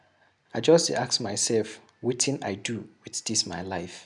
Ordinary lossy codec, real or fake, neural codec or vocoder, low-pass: none; real; none; 10.8 kHz